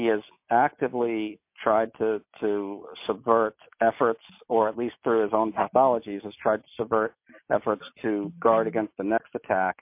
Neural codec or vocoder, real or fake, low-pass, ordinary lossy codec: none; real; 3.6 kHz; MP3, 32 kbps